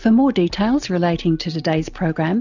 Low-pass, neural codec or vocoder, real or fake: 7.2 kHz; none; real